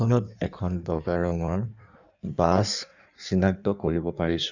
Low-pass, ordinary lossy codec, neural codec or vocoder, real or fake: 7.2 kHz; none; codec, 16 kHz in and 24 kHz out, 1.1 kbps, FireRedTTS-2 codec; fake